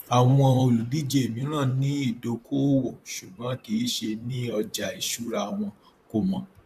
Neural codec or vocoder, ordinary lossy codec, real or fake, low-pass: vocoder, 44.1 kHz, 128 mel bands, Pupu-Vocoder; none; fake; 14.4 kHz